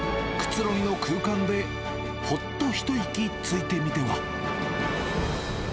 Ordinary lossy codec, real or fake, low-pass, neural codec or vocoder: none; real; none; none